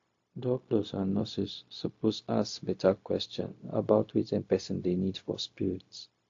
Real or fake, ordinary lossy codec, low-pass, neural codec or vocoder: fake; AAC, 48 kbps; 7.2 kHz; codec, 16 kHz, 0.4 kbps, LongCat-Audio-Codec